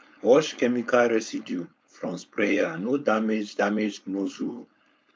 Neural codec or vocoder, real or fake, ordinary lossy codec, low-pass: codec, 16 kHz, 4.8 kbps, FACodec; fake; none; none